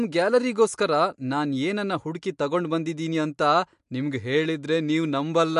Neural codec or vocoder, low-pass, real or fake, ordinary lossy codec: none; 10.8 kHz; real; MP3, 64 kbps